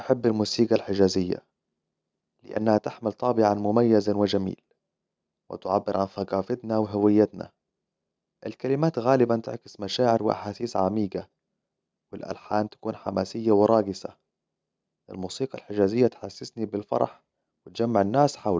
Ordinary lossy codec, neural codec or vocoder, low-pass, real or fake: none; none; none; real